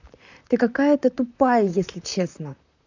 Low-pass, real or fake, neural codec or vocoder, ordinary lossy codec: 7.2 kHz; fake; vocoder, 44.1 kHz, 128 mel bands, Pupu-Vocoder; none